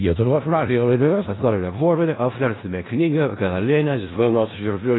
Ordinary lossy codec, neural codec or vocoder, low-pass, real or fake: AAC, 16 kbps; codec, 16 kHz in and 24 kHz out, 0.4 kbps, LongCat-Audio-Codec, four codebook decoder; 7.2 kHz; fake